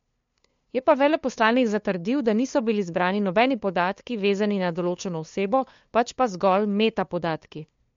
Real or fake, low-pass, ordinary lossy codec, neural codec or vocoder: fake; 7.2 kHz; MP3, 48 kbps; codec, 16 kHz, 2 kbps, FunCodec, trained on LibriTTS, 25 frames a second